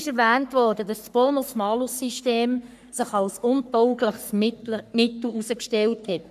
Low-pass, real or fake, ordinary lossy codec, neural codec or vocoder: 14.4 kHz; fake; none; codec, 44.1 kHz, 3.4 kbps, Pupu-Codec